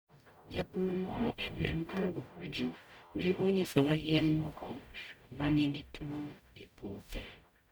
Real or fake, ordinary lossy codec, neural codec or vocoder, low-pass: fake; none; codec, 44.1 kHz, 0.9 kbps, DAC; none